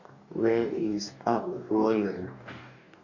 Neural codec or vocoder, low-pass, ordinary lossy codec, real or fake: codec, 44.1 kHz, 2.6 kbps, DAC; 7.2 kHz; none; fake